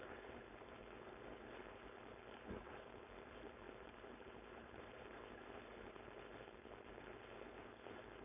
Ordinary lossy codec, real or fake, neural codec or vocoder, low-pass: none; fake; codec, 16 kHz, 4.8 kbps, FACodec; 3.6 kHz